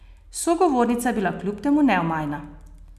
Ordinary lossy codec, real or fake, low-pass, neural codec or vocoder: none; real; 14.4 kHz; none